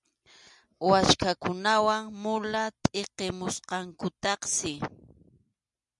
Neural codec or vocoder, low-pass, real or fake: none; 10.8 kHz; real